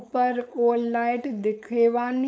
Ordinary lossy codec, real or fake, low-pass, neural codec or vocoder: none; fake; none; codec, 16 kHz, 4.8 kbps, FACodec